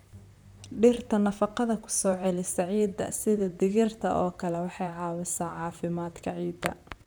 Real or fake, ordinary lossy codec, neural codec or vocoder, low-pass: fake; none; vocoder, 44.1 kHz, 128 mel bands, Pupu-Vocoder; none